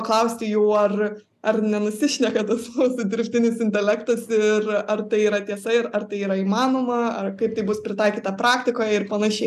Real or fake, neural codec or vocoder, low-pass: real; none; 14.4 kHz